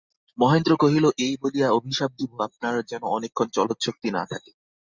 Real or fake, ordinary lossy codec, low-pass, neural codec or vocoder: real; Opus, 64 kbps; 7.2 kHz; none